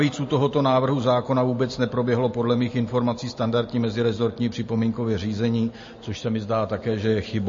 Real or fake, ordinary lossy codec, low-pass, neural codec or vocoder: real; MP3, 32 kbps; 7.2 kHz; none